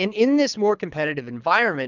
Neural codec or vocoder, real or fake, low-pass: codec, 24 kHz, 3 kbps, HILCodec; fake; 7.2 kHz